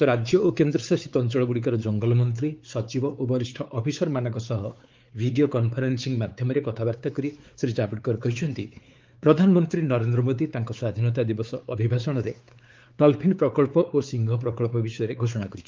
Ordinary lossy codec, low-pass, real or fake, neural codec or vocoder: Opus, 32 kbps; 7.2 kHz; fake; codec, 16 kHz, 4 kbps, X-Codec, WavLM features, trained on Multilingual LibriSpeech